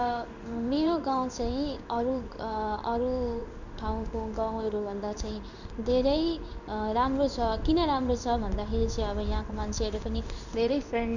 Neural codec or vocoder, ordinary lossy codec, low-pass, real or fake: codec, 16 kHz in and 24 kHz out, 1 kbps, XY-Tokenizer; none; 7.2 kHz; fake